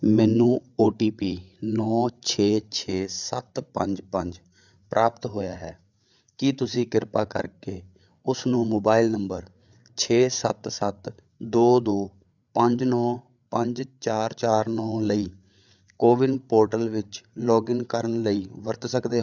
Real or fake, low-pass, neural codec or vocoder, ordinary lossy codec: fake; 7.2 kHz; codec, 16 kHz, 8 kbps, FreqCodec, larger model; none